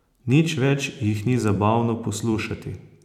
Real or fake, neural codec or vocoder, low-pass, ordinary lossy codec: fake; vocoder, 44.1 kHz, 128 mel bands every 512 samples, BigVGAN v2; 19.8 kHz; none